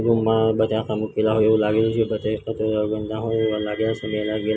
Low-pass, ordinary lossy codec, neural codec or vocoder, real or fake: none; none; none; real